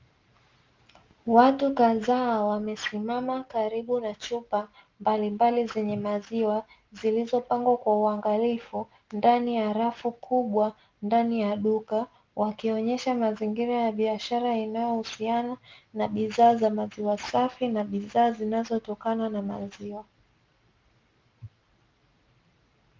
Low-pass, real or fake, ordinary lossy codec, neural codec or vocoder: 7.2 kHz; real; Opus, 32 kbps; none